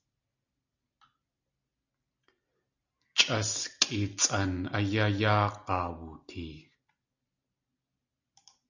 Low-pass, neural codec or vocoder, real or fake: 7.2 kHz; none; real